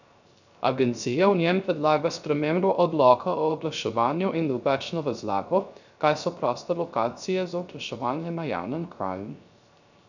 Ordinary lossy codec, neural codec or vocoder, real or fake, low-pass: none; codec, 16 kHz, 0.3 kbps, FocalCodec; fake; 7.2 kHz